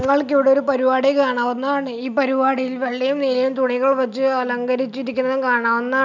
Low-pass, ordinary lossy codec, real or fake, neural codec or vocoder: 7.2 kHz; none; real; none